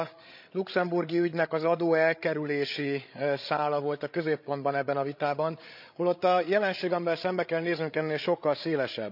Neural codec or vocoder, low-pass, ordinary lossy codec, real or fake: codec, 16 kHz, 16 kbps, FreqCodec, larger model; 5.4 kHz; none; fake